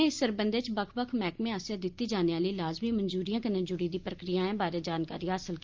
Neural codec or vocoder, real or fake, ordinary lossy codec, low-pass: none; real; Opus, 24 kbps; 7.2 kHz